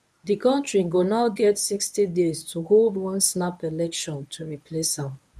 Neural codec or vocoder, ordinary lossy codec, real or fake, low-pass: codec, 24 kHz, 0.9 kbps, WavTokenizer, medium speech release version 1; none; fake; none